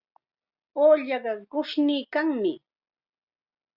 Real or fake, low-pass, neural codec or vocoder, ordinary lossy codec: real; 5.4 kHz; none; Opus, 64 kbps